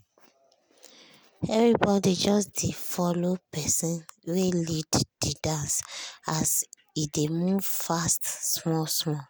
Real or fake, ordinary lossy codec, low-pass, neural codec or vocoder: real; none; none; none